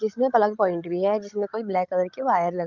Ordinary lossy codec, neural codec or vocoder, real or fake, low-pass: Opus, 24 kbps; codec, 16 kHz, 16 kbps, FreqCodec, larger model; fake; 7.2 kHz